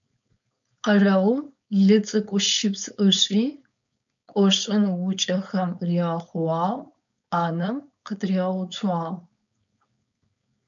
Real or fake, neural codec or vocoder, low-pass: fake; codec, 16 kHz, 4.8 kbps, FACodec; 7.2 kHz